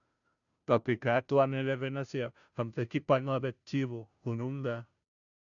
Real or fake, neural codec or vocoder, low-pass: fake; codec, 16 kHz, 0.5 kbps, FunCodec, trained on Chinese and English, 25 frames a second; 7.2 kHz